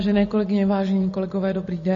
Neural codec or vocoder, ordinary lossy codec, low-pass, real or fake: none; MP3, 32 kbps; 7.2 kHz; real